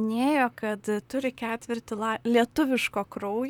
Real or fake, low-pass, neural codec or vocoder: fake; 19.8 kHz; vocoder, 44.1 kHz, 128 mel bands, Pupu-Vocoder